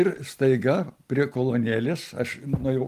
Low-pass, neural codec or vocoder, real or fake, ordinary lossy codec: 14.4 kHz; none; real; Opus, 32 kbps